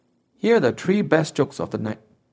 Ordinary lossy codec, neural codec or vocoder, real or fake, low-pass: none; codec, 16 kHz, 0.4 kbps, LongCat-Audio-Codec; fake; none